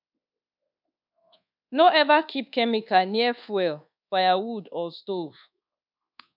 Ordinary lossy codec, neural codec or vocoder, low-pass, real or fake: none; codec, 24 kHz, 1.2 kbps, DualCodec; 5.4 kHz; fake